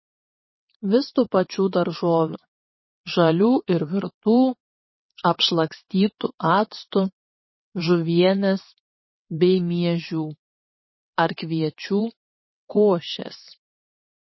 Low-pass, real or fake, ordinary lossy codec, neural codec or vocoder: 7.2 kHz; fake; MP3, 24 kbps; vocoder, 22.05 kHz, 80 mel bands, WaveNeXt